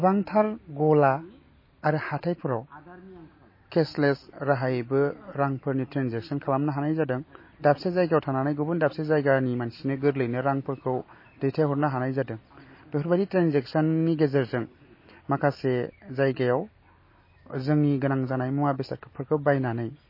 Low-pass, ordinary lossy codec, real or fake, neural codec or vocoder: 5.4 kHz; MP3, 24 kbps; real; none